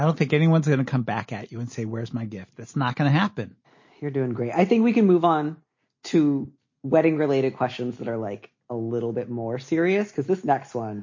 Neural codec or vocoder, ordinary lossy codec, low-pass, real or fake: none; MP3, 32 kbps; 7.2 kHz; real